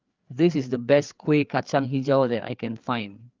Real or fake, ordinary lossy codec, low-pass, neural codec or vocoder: fake; Opus, 24 kbps; 7.2 kHz; codec, 16 kHz, 4 kbps, FreqCodec, larger model